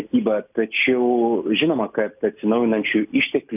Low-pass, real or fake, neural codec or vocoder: 3.6 kHz; real; none